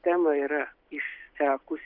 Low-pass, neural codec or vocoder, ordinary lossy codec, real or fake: 5.4 kHz; none; Opus, 32 kbps; real